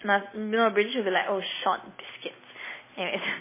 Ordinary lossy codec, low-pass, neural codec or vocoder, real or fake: MP3, 16 kbps; 3.6 kHz; none; real